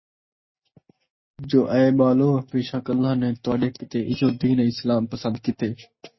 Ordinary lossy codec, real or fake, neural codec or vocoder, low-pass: MP3, 24 kbps; real; none; 7.2 kHz